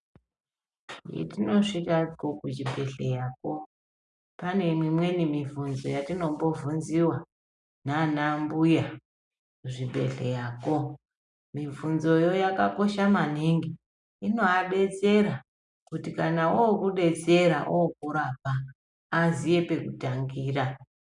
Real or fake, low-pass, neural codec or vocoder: real; 10.8 kHz; none